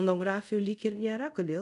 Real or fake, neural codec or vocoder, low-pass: fake; codec, 24 kHz, 0.5 kbps, DualCodec; 10.8 kHz